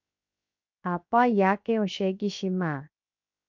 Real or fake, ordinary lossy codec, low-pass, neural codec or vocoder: fake; MP3, 48 kbps; 7.2 kHz; codec, 16 kHz, 0.7 kbps, FocalCodec